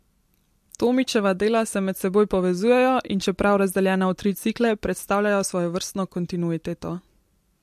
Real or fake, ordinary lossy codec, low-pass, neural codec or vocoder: real; MP3, 64 kbps; 14.4 kHz; none